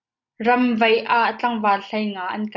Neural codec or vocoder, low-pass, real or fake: none; 7.2 kHz; real